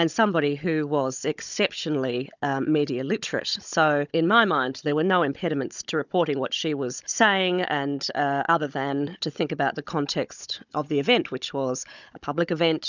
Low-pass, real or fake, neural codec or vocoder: 7.2 kHz; fake; codec, 16 kHz, 16 kbps, FunCodec, trained on Chinese and English, 50 frames a second